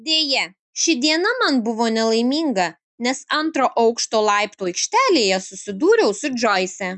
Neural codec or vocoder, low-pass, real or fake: none; 10.8 kHz; real